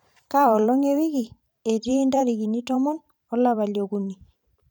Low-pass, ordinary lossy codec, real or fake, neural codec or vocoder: none; none; fake; vocoder, 44.1 kHz, 128 mel bands every 512 samples, BigVGAN v2